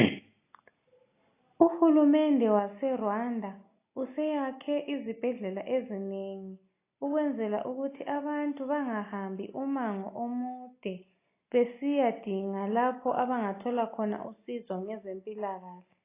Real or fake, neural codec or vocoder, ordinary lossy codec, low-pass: real; none; AAC, 24 kbps; 3.6 kHz